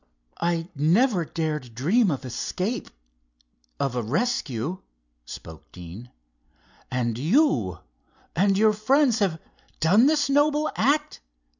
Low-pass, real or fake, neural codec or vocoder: 7.2 kHz; real; none